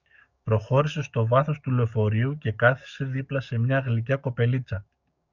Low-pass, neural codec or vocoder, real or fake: 7.2 kHz; codec, 44.1 kHz, 7.8 kbps, DAC; fake